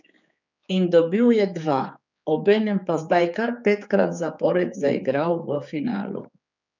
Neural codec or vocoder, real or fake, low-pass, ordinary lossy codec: codec, 16 kHz, 4 kbps, X-Codec, HuBERT features, trained on general audio; fake; 7.2 kHz; none